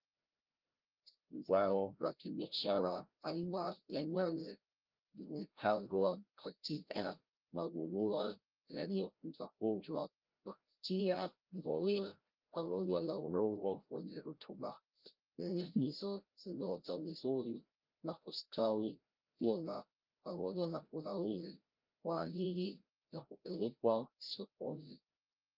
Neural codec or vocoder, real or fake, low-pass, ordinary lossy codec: codec, 16 kHz, 0.5 kbps, FreqCodec, larger model; fake; 5.4 kHz; Opus, 24 kbps